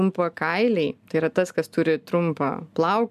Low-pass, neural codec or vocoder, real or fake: 14.4 kHz; none; real